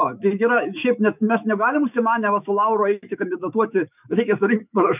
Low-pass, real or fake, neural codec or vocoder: 3.6 kHz; fake; autoencoder, 48 kHz, 128 numbers a frame, DAC-VAE, trained on Japanese speech